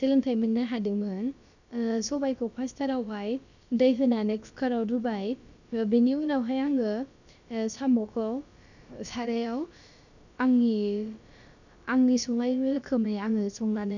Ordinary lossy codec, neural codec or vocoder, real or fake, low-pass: none; codec, 16 kHz, about 1 kbps, DyCAST, with the encoder's durations; fake; 7.2 kHz